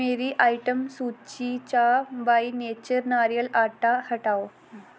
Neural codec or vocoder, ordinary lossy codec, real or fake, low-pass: none; none; real; none